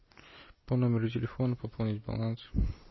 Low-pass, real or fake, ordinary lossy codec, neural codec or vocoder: 7.2 kHz; real; MP3, 24 kbps; none